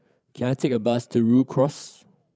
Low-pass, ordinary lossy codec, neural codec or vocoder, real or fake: none; none; codec, 16 kHz, 8 kbps, FreqCodec, larger model; fake